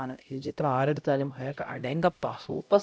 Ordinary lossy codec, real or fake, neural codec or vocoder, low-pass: none; fake; codec, 16 kHz, 0.5 kbps, X-Codec, HuBERT features, trained on LibriSpeech; none